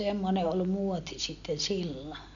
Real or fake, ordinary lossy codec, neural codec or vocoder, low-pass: real; none; none; 7.2 kHz